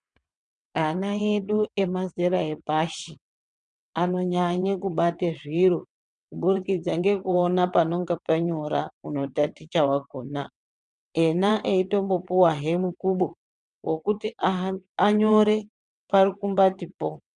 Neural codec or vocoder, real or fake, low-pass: vocoder, 22.05 kHz, 80 mel bands, WaveNeXt; fake; 9.9 kHz